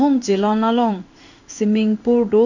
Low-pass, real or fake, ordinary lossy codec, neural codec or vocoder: 7.2 kHz; fake; none; codec, 16 kHz in and 24 kHz out, 1 kbps, XY-Tokenizer